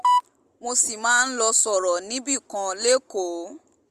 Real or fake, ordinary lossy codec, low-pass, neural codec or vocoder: real; Opus, 32 kbps; 14.4 kHz; none